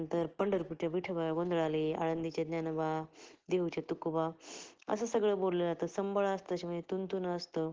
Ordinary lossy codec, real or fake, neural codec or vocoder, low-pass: Opus, 16 kbps; real; none; 7.2 kHz